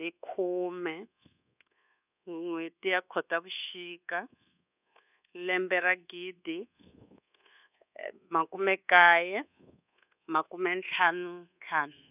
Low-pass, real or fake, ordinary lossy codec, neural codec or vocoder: 3.6 kHz; fake; none; codec, 24 kHz, 1.2 kbps, DualCodec